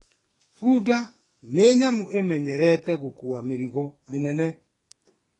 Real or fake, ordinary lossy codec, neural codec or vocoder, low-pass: fake; AAC, 32 kbps; codec, 44.1 kHz, 2.6 kbps, SNAC; 10.8 kHz